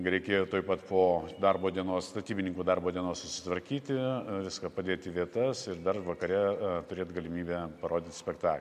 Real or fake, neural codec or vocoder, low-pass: real; none; 14.4 kHz